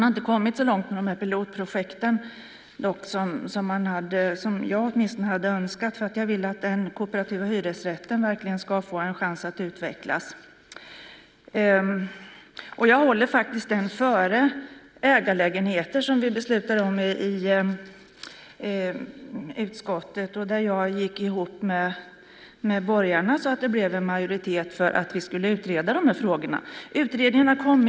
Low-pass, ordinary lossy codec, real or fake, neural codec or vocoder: none; none; real; none